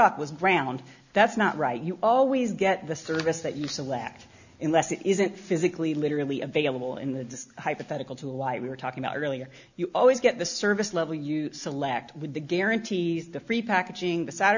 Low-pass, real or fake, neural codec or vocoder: 7.2 kHz; real; none